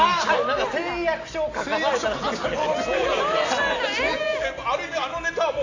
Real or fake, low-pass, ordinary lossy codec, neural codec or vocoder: real; 7.2 kHz; AAC, 32 kbps; none